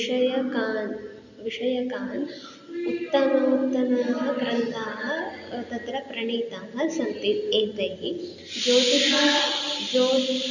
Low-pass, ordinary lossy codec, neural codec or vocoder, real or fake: 7.2 kHz; none; none; real